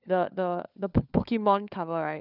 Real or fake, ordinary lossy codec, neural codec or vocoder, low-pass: fake; none; codec, 16 kHz, 4 kbps, FunCodec, trained on LibriTTS, 50 frames a second; 5.4 kHz